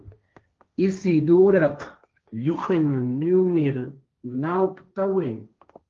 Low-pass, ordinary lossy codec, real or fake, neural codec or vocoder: 7.2 kHz; Opus, 32 kbps; fake; codec, 16 kHz, 1.1 kbps, Voila-Tokenizer